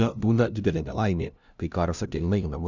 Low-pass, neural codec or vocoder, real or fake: 7.2 kHz; codec, 16 kHz, 0.5 kbps, FunCodec, trained on LibriTTS, 25 frames a second; fake